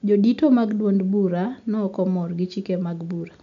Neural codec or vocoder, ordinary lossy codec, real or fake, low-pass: none; MP3, 64 kbps; real; 7.2 kHz